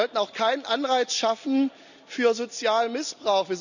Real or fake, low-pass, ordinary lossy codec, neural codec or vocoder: real; 7.2 kHz; none; none